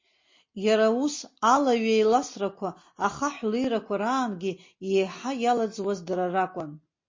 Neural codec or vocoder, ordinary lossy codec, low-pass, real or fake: none; MP3, 32 kbps; 7.2 kHz; real